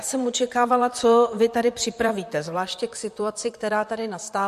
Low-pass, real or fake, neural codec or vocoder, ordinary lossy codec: 14.4 kHz; fake; vocoder, 44.1 kHz, 128 mel bands, Pupu-Vocoder; MP3, 64 kbps